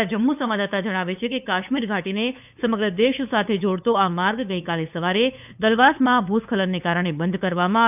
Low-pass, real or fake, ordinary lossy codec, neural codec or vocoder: 3.6 kHz; fake; none; codec, 16 kHz, 8 kbps, FunCodec, trained on LibriTTS, 25 frames a second